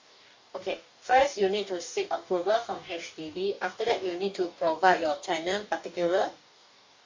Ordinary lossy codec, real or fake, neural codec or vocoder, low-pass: MP3, 64 kbps; fake; codec, 44.1 kHz, 2.6 kbps, DAC; 7.2 kHz